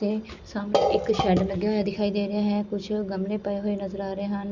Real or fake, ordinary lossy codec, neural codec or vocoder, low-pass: real; Opus, 64 kbps; none; 7.2 kHz